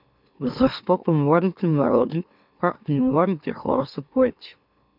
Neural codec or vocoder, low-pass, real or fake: autoencoder, 44.1 kHz, a latent of 192 numbers a frame, MeloTTS; 5.4 kHz; fake